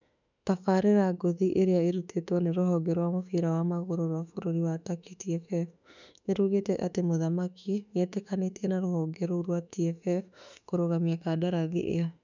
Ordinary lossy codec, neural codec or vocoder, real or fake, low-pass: none; autoencoder, 48 kHz, 32 numbers a frame, DAC-VAE, trained on Japanese speech; fake; 7.2 kHz